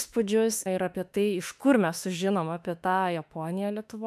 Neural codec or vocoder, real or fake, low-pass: autoencoder, 48 kHz, 32 numbers a frame, DAC-VAE, trained on Japanese speech; fake; 14.4 kHz